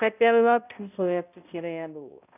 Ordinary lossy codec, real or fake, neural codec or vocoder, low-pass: none; fake; codec, 16 kHz, 0.5 kbps, X-Codec, HuBERT features, trained on balanced general audio; 3.6 kHz